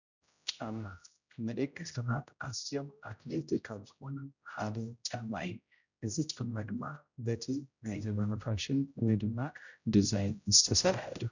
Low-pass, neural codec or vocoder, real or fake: 7.2 kHz; codec, 16 kHz, 0.5 kbps, X-Codec, HuBERT features, trained on general audio; fake